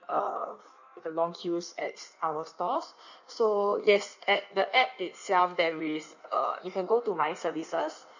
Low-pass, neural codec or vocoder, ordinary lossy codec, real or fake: 7.2 kHz; codec, 16 kHz in and 24 kHz out, 1.1 kbps, FireRedTTS-2 codec; none; fake